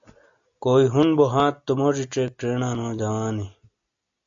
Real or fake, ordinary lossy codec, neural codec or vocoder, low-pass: real; AAC, 64 kbps; none; 7.2 kHz